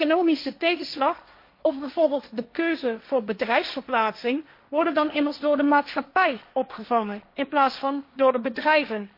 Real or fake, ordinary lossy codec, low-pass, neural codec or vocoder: fake; MP3, 32 kbps; 5.4 kHz; codec, 16 kHz, 1.1 kbps, Voila-Tokenizer